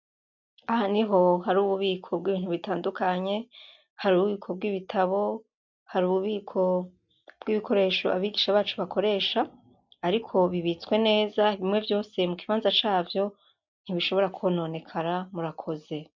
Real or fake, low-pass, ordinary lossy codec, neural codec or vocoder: real; 7.2 kHz; MP3, 64 kbps; none